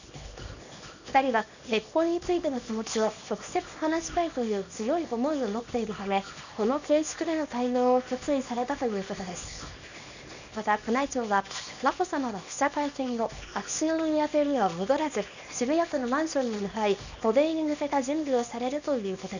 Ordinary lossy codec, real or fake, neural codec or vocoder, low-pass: none; fake; codec, 24 kHz, 0.9 kbps, WavTokenizer, small release; 7.2 kHz